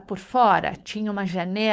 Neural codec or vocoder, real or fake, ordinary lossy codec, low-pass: codec, 16 kHz, 4.8 kbps, FACodec; fake; none; none